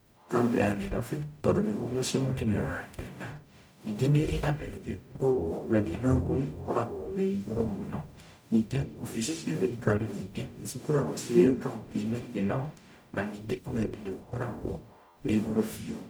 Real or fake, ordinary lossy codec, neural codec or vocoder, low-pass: fake; none; codec, 44.1 kHz, 0.9 kbps, DAC; none